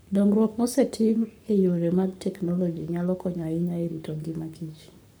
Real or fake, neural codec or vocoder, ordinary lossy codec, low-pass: fake; codec, 44.1 kHz, 7.8 kbps, Pupu-Codec; none; none